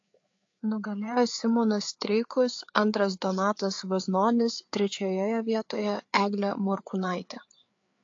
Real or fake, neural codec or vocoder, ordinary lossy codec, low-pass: fake; codec, 16 kHz, 4 kbps, FreqCodec, larger model; AAC, 48 kbps; 7.2 kHz